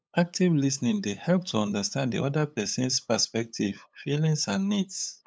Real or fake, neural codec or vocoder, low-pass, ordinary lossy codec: fake; codec, 16 kHz, 8 kbps, FunCodec, trained on LibriTTS, 25 frames a second; none; none